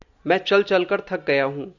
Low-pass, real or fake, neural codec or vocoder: 7.2 kHz; real; none